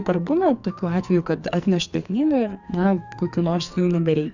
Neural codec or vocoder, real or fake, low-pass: codec, 44.1 kHz, 2.6 kbps, SNAC; fake; 7.2 kHz